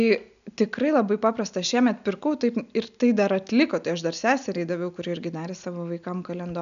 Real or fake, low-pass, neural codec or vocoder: real; 7.2 kHz; none